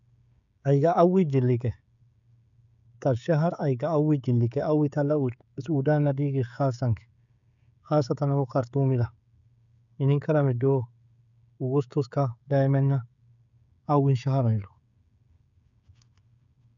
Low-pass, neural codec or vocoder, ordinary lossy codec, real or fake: 7.2 kHz; codec, 16 kHz, 16 kbps, FreqCodec, smaller model; MP3, 96 kbps; fake